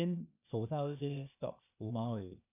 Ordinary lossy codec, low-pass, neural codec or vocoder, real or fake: none; 3.6 kHz; codec, 16 kHz, 0.8 kbps, ZipCodec; fake